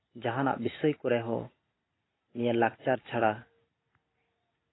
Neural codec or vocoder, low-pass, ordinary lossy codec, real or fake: vocoder, 44.1 kHz, 128 mel bands every 256 samples, BigVGAN v2; 7.2 kHz; AAC, 16 kbps; fake